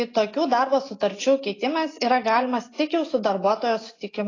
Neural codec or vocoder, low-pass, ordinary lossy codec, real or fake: none; 7.2 kHz; AAC, 32 kbps; real